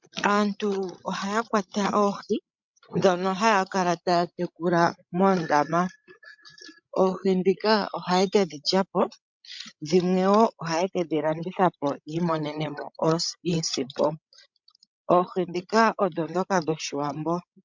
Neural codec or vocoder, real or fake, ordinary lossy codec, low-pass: codec, 16 kHz, 16 kbps, FreqCodec, larger model; fake; MP3, 64 kbps; 7.2 kHz